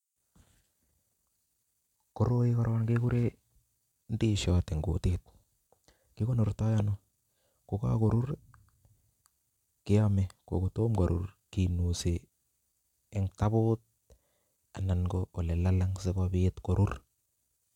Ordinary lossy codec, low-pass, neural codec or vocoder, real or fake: none; 19.8 kHz; none; real